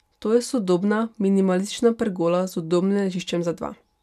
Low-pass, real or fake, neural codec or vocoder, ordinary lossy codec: 14.4 kHz; real; none; none